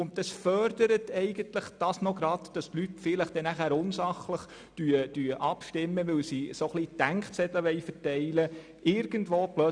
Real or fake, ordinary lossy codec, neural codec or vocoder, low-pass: real; none; none; 9.9 kHz